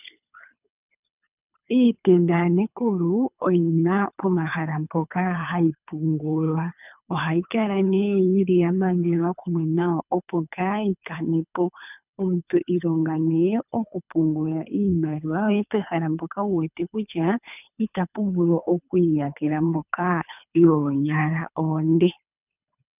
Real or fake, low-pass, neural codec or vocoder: fake; 3.6 kHz; codec, 24 kHz, 3 kbps, HILCodec